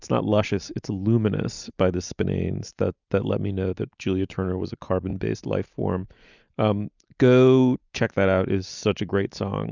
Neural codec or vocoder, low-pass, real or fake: none; 7.2 kHz; real